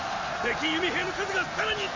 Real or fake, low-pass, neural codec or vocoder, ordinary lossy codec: real; 7.2 kHz; none; MP3, 32 kbps